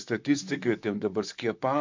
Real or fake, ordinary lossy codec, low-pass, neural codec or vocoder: fake; MP3, 64 kbps; 7.2 kHz; vocoder, 44.1 kHz, 128 mel bands, Pupu-Vocoder